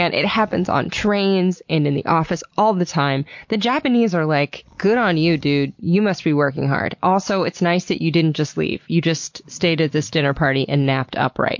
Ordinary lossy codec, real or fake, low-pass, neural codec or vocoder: MP3, 48 kbps; real; 7.2 kHz; none